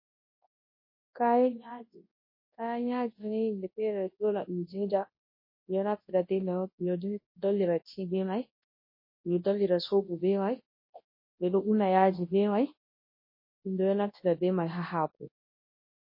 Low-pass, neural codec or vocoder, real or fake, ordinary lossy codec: 5.4 kHz; codec, 24 kHz, 0.9 kbps, WavTokenizer, large speech release; fake; MP3, 24 kbps